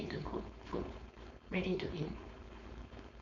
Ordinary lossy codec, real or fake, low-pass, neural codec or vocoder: none; fake; 7.2 kHz; codec, 16 kHz, 4.8 kbps, FACodec